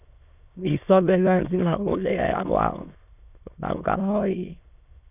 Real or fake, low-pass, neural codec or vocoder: fake; 3.6 kHz; autoencoder, 22.05 kHz, a latent of 192 numbers a frame, VITS, trained on many speakers